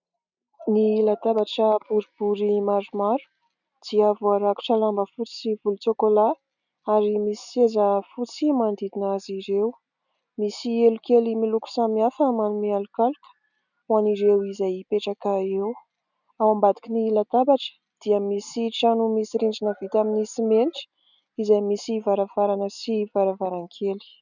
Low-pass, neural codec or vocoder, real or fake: 7.2 kHz; none; real